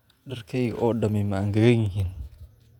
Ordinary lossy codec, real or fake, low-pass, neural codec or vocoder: none; fake; 19.8 kHz; vocoder, 48 kHz, 128 mel bands, Vocos